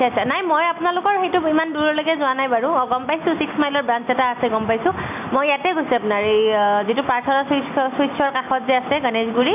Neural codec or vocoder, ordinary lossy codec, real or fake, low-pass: none; none; real; 3.6 kHz